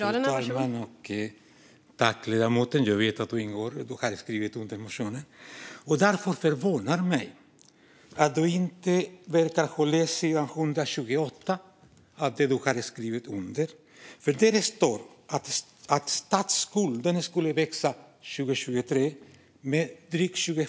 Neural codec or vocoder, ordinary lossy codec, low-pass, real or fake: none; none; none; real